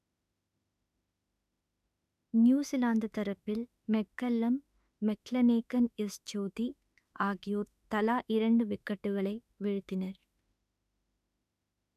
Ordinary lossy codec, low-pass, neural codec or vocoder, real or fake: none; 14.4 kHz; autoencoder, 48 kHz, 32 numbers a frame, DAC-VAE, trained on Japanese speech; fake